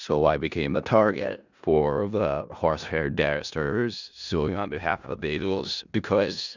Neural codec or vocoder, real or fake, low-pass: codec, 16 kHz in and 24 kHz out, 0.4 kbps, LongCat-Audio-Codec, four codebook decoder; fake; 7.2 kHz